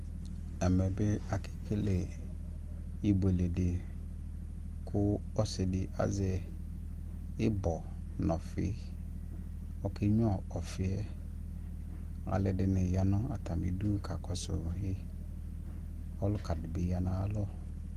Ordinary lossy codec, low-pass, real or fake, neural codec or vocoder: Opus, 24 kbps; 14.4 kHz; real; none